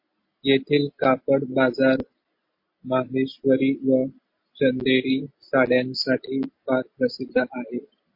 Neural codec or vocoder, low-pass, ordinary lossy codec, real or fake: none; 5.4 kHz; MP3, 32 kbps; real